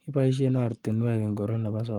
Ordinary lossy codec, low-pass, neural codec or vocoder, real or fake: Opus, 32 kbps; 19.8 kHz; codec, 44.1 kHz, 7.8 kbps, Pupu-Codec; fake